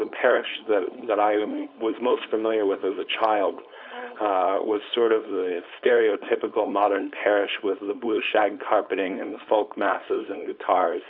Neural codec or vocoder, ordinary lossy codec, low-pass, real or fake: codec, 16 kHz, 4.8 kbps, FACodec; AAC, 48 kbps; 5.4 kHz; fake